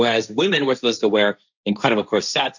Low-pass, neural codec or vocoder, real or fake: 7.2 kHz; codec, 16 kHz, 1.1 kbps, Voila-Tokenizer; fake